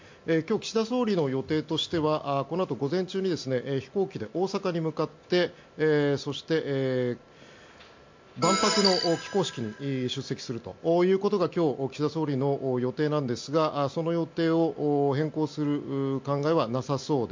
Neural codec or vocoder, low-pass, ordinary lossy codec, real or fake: none; 7.2 kHz; MP3, 48 kbps; real